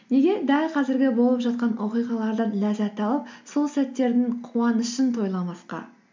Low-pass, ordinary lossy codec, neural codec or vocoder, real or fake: 7.2 kHz; MP3, 64 kbps; none; real